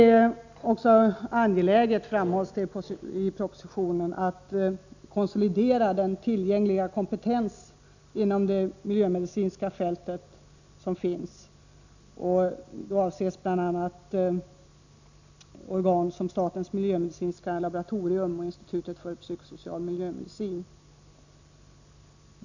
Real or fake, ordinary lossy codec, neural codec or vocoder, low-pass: real; none; none; 7.2 kHz